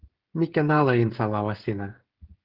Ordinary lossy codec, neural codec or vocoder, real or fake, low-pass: Opus, 16 kbps; codec, 16 kHz, 16 kbps, FreqCodec, smaller model; fake; 5.4 kHz